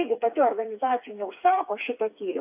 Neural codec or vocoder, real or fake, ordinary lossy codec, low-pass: codec, 16 kHz, 4 kbps, FreqCodec, smaller model; fake; AAC, 32 kbps; 3.6 kHz